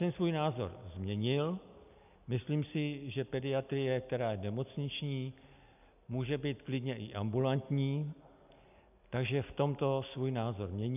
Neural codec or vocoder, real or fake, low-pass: none; real; 3.6 kHz